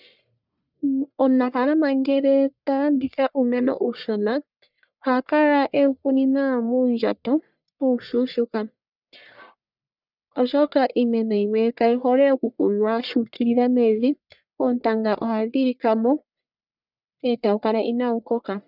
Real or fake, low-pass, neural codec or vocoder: fake; 5.4 kHz; codec, 44.1 kHz, 1.7 kbps, Pupu-Codec